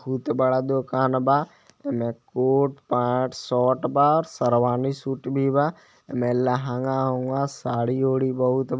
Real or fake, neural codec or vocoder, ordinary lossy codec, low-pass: real; none; none; none